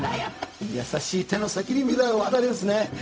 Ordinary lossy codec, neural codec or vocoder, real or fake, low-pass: none; codec, 16 kHz, 0.4 kbps, LongCat-Audio-Codec; fake; none